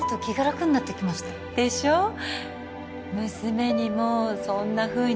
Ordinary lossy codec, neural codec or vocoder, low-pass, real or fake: none; none; none; real